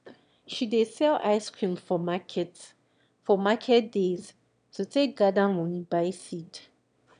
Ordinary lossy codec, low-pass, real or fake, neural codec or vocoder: none; 9.9 kHz; fake; autoencoder, 22.05 kHz, a latent of 192 numbers a frame, VITS, trained on one speaker